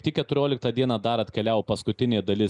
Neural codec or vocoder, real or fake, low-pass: none; real; 10.8 kHz